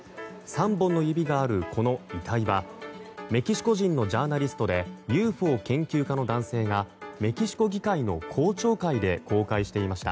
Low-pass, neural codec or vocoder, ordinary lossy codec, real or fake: none; none; none; real